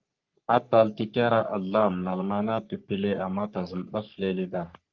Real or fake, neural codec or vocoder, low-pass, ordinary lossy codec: fake; codec, 44.1 kHz, 3.4 kbps, Pupu-Codec; 7.2 kHz; Opus, 24 kbps